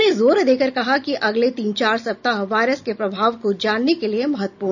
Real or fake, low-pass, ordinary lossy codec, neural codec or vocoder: real; 7.2 kHz; none; none